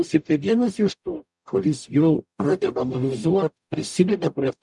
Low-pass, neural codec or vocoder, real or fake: 10.8 kHz; codec, 44.1 kHz, 0.9 kbps, DAC; fake